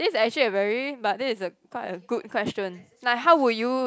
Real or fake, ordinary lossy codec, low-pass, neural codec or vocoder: real; none; none; none